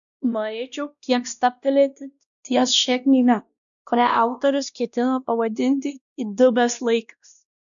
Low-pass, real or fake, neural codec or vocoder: 7.2 kHz; fake; codec, 16 kHz, 1 kbps, X-Codec, WavLM features, trained on Multilingual LibriSpeech